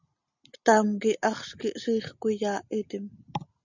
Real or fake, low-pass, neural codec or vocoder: real; 7.2 kHz; none